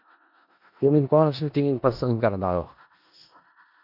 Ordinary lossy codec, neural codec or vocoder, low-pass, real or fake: AAC, 48 kbps; codec, 16 kHz in and 24 kHz out, 0.4 kbps, LongCat-Audio-Codec, four codebook decoder; 5.4 kHz; fake